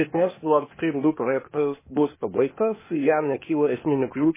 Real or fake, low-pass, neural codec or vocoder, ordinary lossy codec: fake; 3.6 kHz; codec, 16 kHz, 0.8 kbps, ZipCodec; MP3, 16 kbps